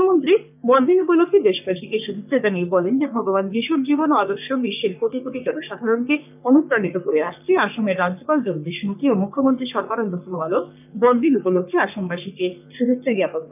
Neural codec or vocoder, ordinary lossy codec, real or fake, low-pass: codec, 44.1 kHz, 3.4 kbps, Pupu-Codec; none; fake; 3.6 kHz